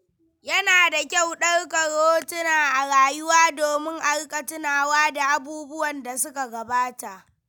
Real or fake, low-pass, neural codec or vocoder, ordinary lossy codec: real; none; none; none